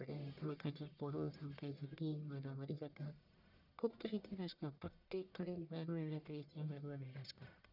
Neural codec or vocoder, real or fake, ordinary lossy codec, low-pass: codec, 44.1 kHz, 1.7 kbps, Pupu-Codec; fake; none; 5.4 kHz